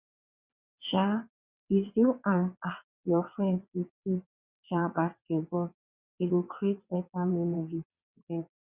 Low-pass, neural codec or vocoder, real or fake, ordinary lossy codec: 3.6 kHz; vocoder, 22.05 kHz, 80 mel bands, WaveNeXt; fake; Opus, 64 kbps